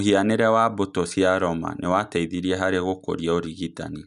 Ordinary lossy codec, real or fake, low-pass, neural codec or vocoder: none; real; 10.8 kHz; none